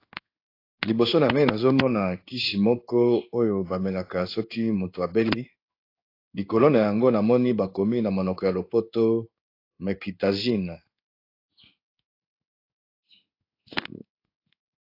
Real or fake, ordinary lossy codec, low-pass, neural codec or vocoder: fake; AAC, 32 kbps; 5.4 kHz; codec, 16 kHz in and 24 kHz out, 1 kbps, XY-Tokenizer